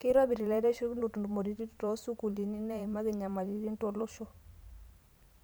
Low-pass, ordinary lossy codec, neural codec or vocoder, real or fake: none; none; vocoder, 44.1 kHz, 128 mel bands every 512 samples, BigVGAN v2; fake